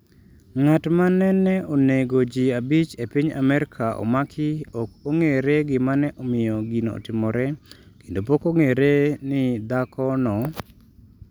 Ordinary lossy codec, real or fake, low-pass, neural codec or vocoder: none; real; none; none